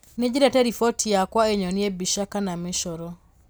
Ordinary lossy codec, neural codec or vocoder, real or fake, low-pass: none; none; real; none